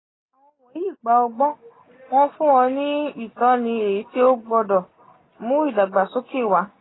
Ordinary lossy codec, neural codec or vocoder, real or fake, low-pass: AAC, 16 kbps; none; real; 7.2 kHz